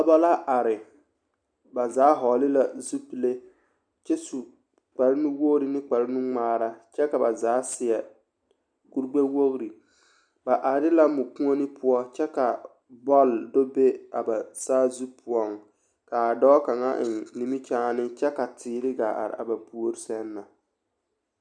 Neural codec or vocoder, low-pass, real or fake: none; 9.9 kHz; real